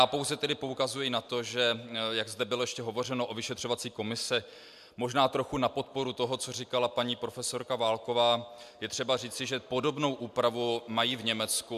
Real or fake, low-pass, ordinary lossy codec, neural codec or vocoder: real; 14.4 kHz; MP3, 96 kbps; none